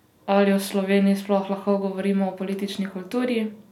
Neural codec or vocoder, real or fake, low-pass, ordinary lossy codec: none; real; 19.8 kHz; none